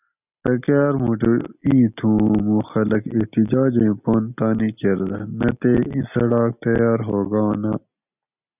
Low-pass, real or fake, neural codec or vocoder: 3.6 kHz; real; none